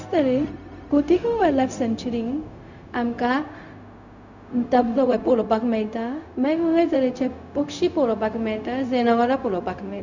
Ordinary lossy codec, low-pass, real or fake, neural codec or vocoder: none; 7.2 kHz; fake; codec, 16 kHz, 0.4 kbps, LongCat-Audio-Codec